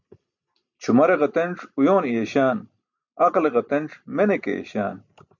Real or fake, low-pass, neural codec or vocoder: real; 7.2 kHz; none